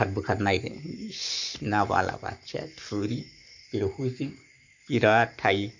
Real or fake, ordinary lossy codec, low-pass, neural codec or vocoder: fake; none; 7.2 kHz; codec, 44.1 kHz, 7.8 kbps, Pupu-Codec